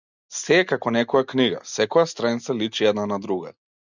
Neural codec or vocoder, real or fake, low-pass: none; real; 7.2 kHz